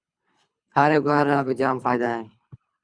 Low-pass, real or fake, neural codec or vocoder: 9.9 kHz; fake; codec, 24 kHz, 3 kbps, HILCodec